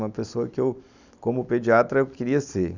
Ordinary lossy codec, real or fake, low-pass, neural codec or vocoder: none; real; 7.2 kHz; none